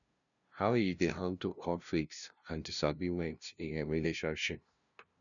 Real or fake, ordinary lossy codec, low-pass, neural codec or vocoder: fake; none; 7.2 kHz; codec, 16 kHz, 0.5 kbps, FunCodec, trained on LibriTTS, 25 frames a second